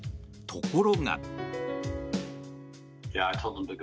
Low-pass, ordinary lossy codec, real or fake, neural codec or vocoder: none; none; real; none